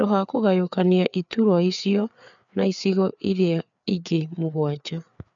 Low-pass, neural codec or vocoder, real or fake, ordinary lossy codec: 7.2 kHz; codec, 16 kHz, 4 kbps, FreqCodec, larger model; fake; none